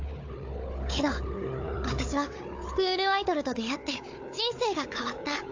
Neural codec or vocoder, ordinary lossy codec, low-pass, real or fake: codec, 16 kHz, 4 kbps, FunCodec, trained on Chinese and English, 50 frames a second; AAC, 48 kbps; 7.2 kHz; fake